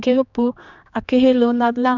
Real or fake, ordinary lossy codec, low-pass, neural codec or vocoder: fake; none; 7.2 kHz; codec, 16 kHz, 2 kbps, X-Codec, HuBERT features, trained on general audio